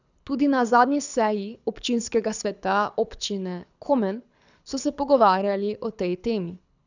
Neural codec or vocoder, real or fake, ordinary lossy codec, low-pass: codec, 24 kHz, 6 kbps, HILCodec; fake; none; 7.2 kHz